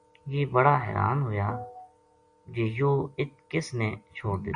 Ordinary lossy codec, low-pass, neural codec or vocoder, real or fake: MP3, 64 kbps; 9.9 kHz; none; real